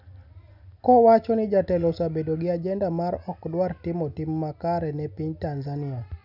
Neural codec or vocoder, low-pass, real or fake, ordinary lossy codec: none; 5.4 kHz; real; none